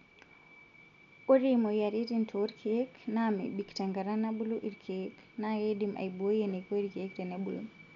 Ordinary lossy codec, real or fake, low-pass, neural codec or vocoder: none; real; 7.2 kHz; none